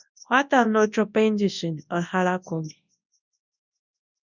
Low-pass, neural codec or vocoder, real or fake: 7.2 kHz; codec, 24 kHz, 0.9 kbps, WavTokenizer, large speech release; fake